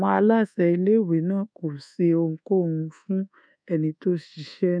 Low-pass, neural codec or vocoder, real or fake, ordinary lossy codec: 9.9 kHz; codec, 24 kHz, 1.2 kbps, DualCodec; fake; none